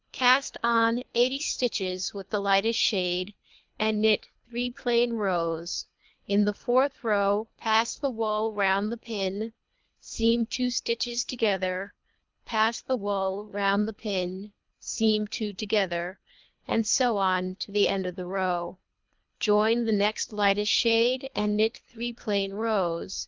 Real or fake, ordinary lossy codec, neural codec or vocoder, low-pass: fake; Opus, 24 kbps; codec, 24 kHz, 3 kbps, HILCodec; 7.2 kHz